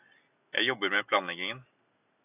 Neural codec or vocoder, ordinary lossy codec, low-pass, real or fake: none; AAC, 32 kbps; 3.6 kHz; real